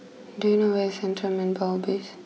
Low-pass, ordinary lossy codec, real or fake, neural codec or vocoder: none; none; real; none